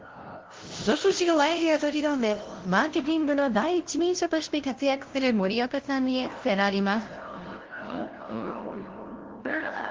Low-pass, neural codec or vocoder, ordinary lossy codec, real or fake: 7.2 kHz; codec, 16 kHz, 0.5 kbps, FunCodec, trained on LibriTTS, 25 frames a second; Opus, 16 kbps; fake